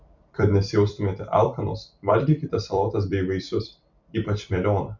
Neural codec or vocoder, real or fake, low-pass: vocoder, 44.1 kHz, 128 mel bands every 256 samples, BigVGAN v2; fake; 7.2 kHz